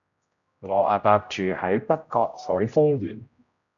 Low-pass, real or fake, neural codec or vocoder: 7.2 kHz; fake; codec, 16 kHz, 0.5 kbps, X-Codec, HuBERT features, trained on general audio